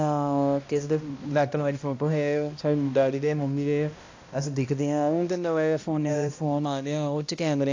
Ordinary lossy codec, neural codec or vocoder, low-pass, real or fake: AAC, 48 kbps; codec, 16 kHz, 1 kbps, X-Codec, HuBERT features, trained on balanced general audio; 7.2 kHz; fake